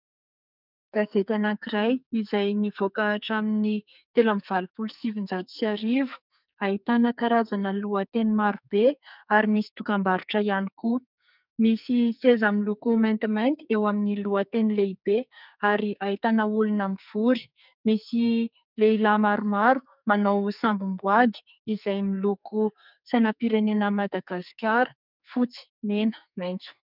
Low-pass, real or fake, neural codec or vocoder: 5.4 kHz; fake; codec, 44.1 kHz, 2.6 kbps, SNAC